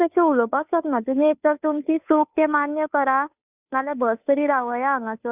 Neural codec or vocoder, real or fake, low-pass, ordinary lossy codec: codec, 16 kHz, 2 kbps, FunCodec, trained on Chinese and English, 25 frames a second; fake; 3.6 kHz; none